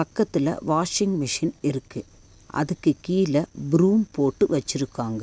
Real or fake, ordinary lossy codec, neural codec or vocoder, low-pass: real; none; none; none